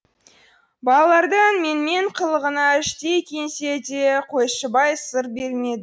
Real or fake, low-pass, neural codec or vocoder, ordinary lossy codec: real; none; none; none